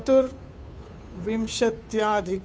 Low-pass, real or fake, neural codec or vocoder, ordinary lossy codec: none; fake; codec, 16 kHz, 2 kbps, FunCodec, trained on Chinese and English, 25 frames a second; none